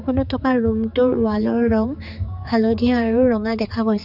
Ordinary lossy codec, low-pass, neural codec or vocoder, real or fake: none; 5.4 kHz; codec, 16 kHz, 4 kbps, X-Codec, HuBERT features, trained on general audio; fake